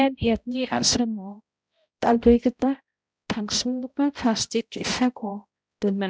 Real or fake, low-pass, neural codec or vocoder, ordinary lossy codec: fake; none; codec, 16 kHz, 0.5 kbps, X-Codec, HuBERT features, trained on balanced general audio; none